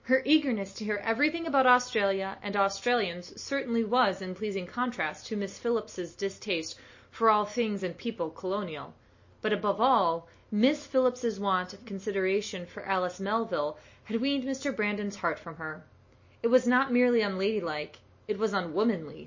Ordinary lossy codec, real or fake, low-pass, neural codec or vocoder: MP3, 32 kbps; real; 7.2 kHz; none